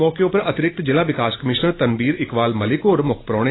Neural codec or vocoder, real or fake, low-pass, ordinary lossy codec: none; real; 7.2 kHz; AAC, 16 kbps